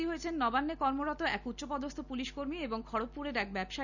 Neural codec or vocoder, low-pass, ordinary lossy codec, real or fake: none; 7.2 kHz; none; real